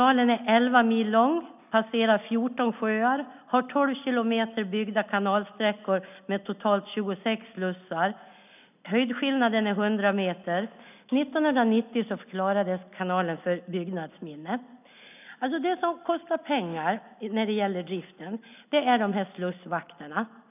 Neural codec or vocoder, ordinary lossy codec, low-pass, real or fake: none; none; 3.6 kHz; real